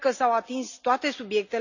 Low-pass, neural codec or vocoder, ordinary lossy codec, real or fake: 7.2 kHz; none; none; real